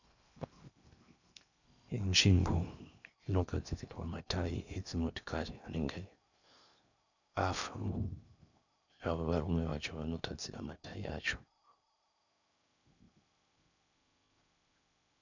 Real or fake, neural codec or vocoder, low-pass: fake; codec, 16 kHz in and 24 kHz out, 0.8 kbps, FocalCodec, streaming, 65536 codes; 7.2 kHz